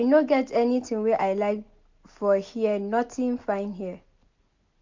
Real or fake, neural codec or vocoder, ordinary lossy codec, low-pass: real; none; MP3, 64 kbps; 7.2 kHz